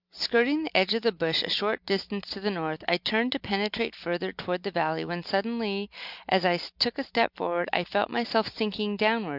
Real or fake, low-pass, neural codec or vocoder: real; 5.4 kHz; none